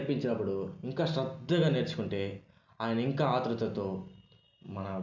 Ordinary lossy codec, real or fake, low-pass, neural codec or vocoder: none; real; 7.2 kHz; none